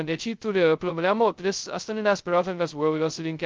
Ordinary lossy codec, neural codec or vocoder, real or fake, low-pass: Opus, 24 kbps; codec, 16 kHz, 0.2 kbps, FocalCodec; fake; 7.2 kHz